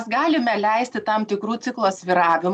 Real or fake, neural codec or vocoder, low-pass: real; none; 10.8 kHz